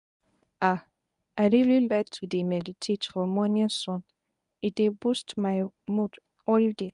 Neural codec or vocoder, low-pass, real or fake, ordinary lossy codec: codec, 24 kHz, 0.9 kbps, WavTokenizer, medium speech release version 1; 10.8 kHz; fake; none